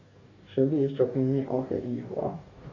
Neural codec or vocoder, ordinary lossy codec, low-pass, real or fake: codec, 44.1 kHz, 2.6 kbps, DAC; AAC, 32 kbps; 7.2 kHz; fake